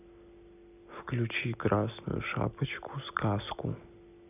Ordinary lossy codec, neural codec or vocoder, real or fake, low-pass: none; none; real; 3.6 kHz